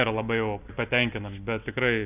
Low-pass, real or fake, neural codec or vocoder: 3.6 kHz; real; none